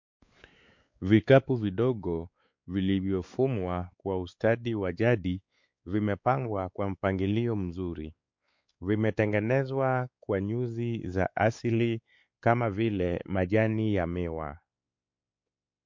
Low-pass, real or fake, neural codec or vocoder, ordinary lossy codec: 7.2 kHz; fake; codec, 16 kHz, 4 kbps, X-Codec, WavLM features, trained on Multilingual LibriSpeech; MP3, 48 kbps